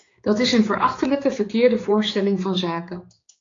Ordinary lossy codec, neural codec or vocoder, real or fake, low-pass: AAC, 32 kbps; codec, 16 kHz, 4 kbps, X-Codec, HuBERT features, trained on general audio; fake; 7.2 kHz